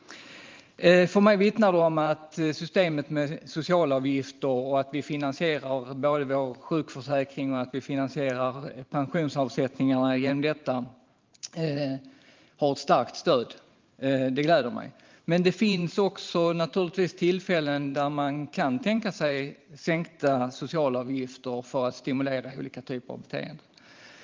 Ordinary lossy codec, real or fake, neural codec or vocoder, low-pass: Opus, 32 kbps; fake; vocoder, 44.1 kHz, 128 mel bands every 512 samples, BigVGAN v2; 7.2 kHz